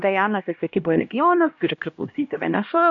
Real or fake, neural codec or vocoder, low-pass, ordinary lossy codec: fake; codec, 16 kHz, 1 kbps, X-Codec, HuBERT features, trained on LibriSpeech; 7.2 kHz; AAC, 48 kbps